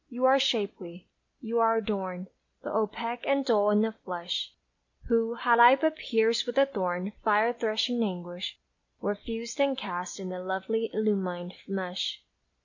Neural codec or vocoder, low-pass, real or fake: none; 7.2 kHz; real